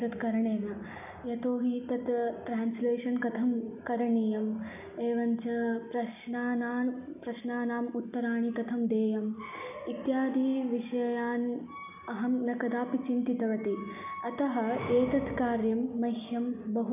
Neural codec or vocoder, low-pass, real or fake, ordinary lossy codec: none; 3.6 kHz; real; none